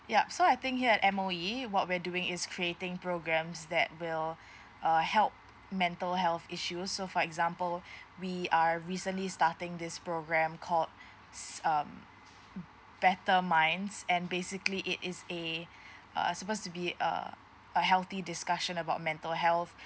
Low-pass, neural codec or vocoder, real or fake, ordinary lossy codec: none; none; real; none